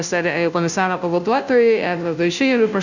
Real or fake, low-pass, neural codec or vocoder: fake; 7.2 kHz; codec, 16 kHz, 0.5 kbps, FunCodec, trained on Chinese and English, 25 frames a second